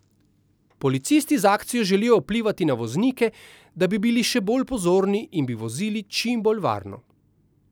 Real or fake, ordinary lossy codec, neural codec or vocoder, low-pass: real; none; none; none